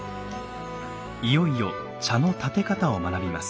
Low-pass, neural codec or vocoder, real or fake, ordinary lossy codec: none; none; real; none